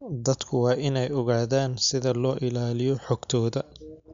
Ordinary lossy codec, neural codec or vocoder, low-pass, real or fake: MP3, 64 kbps; none; 7.2 kHz; real